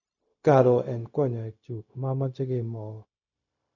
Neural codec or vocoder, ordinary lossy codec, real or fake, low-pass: codec, 16 kHz, 0.4 kbps, LongCat-Audio-Codec; none; fake; 7.2 kHz